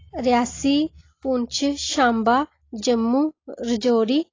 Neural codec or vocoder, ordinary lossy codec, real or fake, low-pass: none; AAC, 32 kbps; real; 7.2 kHz